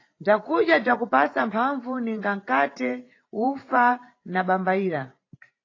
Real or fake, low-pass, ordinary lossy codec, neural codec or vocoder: fake; 7.2 kHz; AAC, 32 kbps; vocoder, 44.1 kHz, 128 mel bands every 512 samples, BigVGAN v2